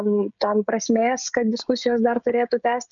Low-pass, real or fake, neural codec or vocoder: 7.2 kHz; fake; codec, 16 kHz, 16 kbps, FreqCodec, smaller model